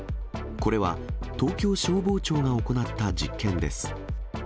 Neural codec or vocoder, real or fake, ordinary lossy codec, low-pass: none; real; none; none